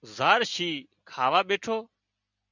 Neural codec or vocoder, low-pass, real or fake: none; 7.2 kHz; real